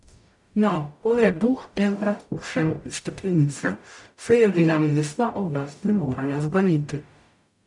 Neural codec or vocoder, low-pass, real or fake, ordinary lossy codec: codec, 44.1 kHz, 0.9 kbps, DAC; 10.8 kHz; fake; none